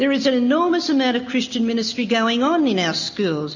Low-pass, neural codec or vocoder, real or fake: 7.2 kHz; none; real